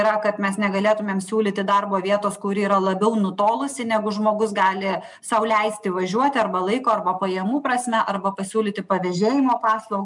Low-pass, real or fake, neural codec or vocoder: 10.8 kHz; real; none